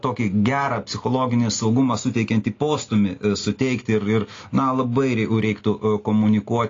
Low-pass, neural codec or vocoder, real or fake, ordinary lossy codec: 7.2 kHz; none; real; AAC, 32 kbps